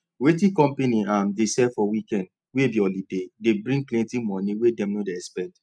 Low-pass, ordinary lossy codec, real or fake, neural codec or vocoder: 9.9 kHz; none; real; none